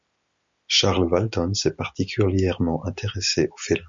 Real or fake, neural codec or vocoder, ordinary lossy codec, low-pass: real; none; MP3, 48 kbps; 7.2 kHz